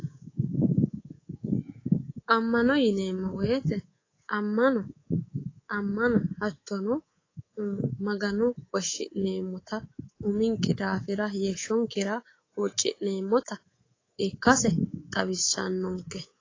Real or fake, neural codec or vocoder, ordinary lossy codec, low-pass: fake; codec, 24 kHz, 3.1 kbps, DualCodec; AAC, 32 kbps; 7.2 kHz